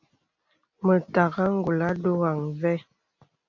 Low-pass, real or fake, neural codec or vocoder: 7.2 kHz; real; none